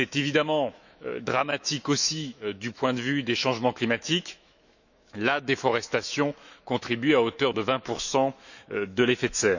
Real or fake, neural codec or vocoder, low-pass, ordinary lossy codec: fake; autoencoder, 48 kHz, 128 numbers a frame, DAC-VAE, trained on Japanese speech; 7.2 kHz; none